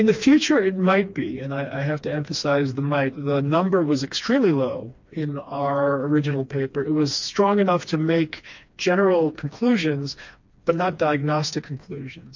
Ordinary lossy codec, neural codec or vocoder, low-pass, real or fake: MP3, 48 kbps; codec, 16 kHz, 2 kbps, FreqCodec, smaller model; 7.2 kHz; fake